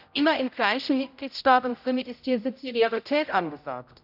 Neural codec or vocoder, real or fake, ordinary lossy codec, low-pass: codec, 16 kHz, 0.5 kbps, X-Codec, HuBERT features, trained on general audio; fake; none; 5.4 kHz